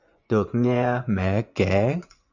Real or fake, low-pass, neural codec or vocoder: fake; 7.2 kHz; vocoder, 24 kHz, 100 mel bands, Vocos